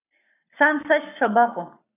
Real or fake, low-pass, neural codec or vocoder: fake; 3.6 kHz; codec, 44.1 kHz, 7.8 kbps, Pupu-Codec